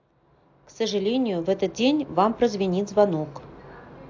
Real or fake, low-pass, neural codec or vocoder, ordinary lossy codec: real; 7.2 kHz; none; AAC, 48 kbps